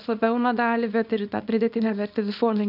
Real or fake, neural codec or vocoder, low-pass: fake; codec, 24 kHz, 0.9 kbps, WavTokenizer, small release; 5.4 kHz